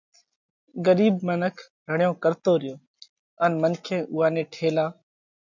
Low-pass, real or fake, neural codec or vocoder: 7.2 kHz; real; none